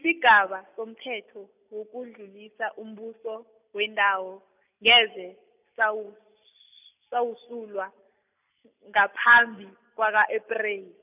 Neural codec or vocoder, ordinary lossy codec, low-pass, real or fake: none; none; 3.6 kHz; real